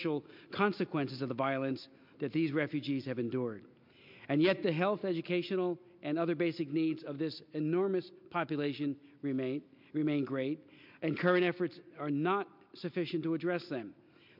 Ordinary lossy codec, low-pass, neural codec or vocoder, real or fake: MP3, 48 kbps; 5.4 kHz; none; real